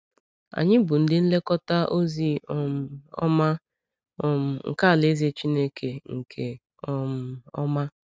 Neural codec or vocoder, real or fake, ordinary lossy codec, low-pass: none; real; none; none